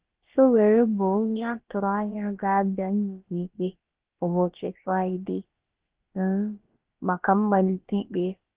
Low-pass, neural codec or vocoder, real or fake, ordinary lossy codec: 3.6 kHz; codec, 16 kHz, about 1 kbps, DyCAST, with the encoder's durations; fake; Opus, 16 kbps